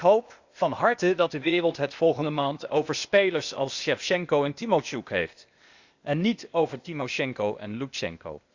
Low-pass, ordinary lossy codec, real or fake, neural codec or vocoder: 7.2 kHz; Opus, 64 kbps; fake; codec, 16 kHz, 0.8 kbps, ZipCodec